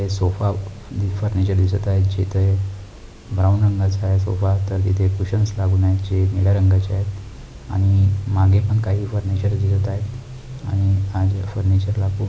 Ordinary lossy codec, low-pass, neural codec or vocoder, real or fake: none; none; none; real